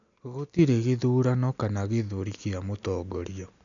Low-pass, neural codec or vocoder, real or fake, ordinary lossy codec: 7.2 kHz; none; real; none